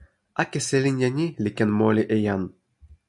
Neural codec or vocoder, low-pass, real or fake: none; 10.8 kHz; real